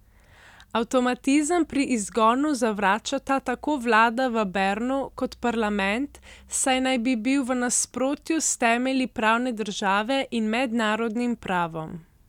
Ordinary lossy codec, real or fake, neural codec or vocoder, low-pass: none; real; none; 19.8 kHz